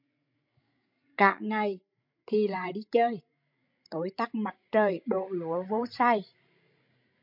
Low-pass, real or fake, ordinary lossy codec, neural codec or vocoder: 5.4 kHz; fake; MP3, 48 kbps; codec, 16 kHz, 16 kbps, FreqCodec, larger model